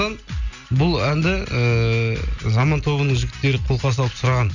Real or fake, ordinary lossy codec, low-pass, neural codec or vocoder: real; none; 7.2 kHz; none